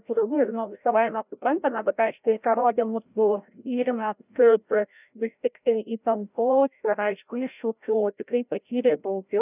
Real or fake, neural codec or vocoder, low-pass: fake; codec, 16 kHz, 0.5 kbps, FreqCodec, larger model; 3.6 kHz